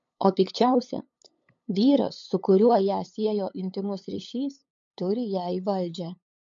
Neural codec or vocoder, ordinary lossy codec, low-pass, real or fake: codec, 16 kHz, 8 kbps, FunCodec, trained on LibriTTS, 25 frames a second; MP3, 48 kbps; 7.2 kHz; fake